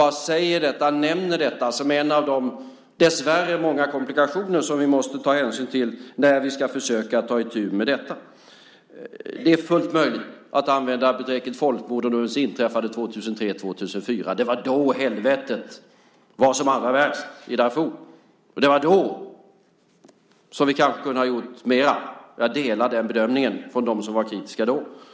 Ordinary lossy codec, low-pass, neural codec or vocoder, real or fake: none; none; none; real